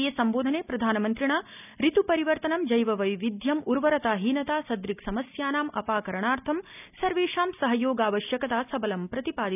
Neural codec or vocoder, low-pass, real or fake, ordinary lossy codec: none; 3.6 kHz; real; none